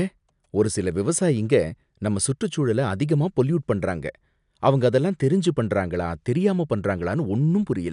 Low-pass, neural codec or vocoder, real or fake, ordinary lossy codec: 10.8 kHz; none; real; none